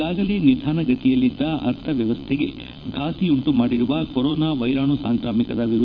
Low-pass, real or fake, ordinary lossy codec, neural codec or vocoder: 7.2 kHz; fake; none; vocoder, 22.05 kHz, 80 mel bands, Vocos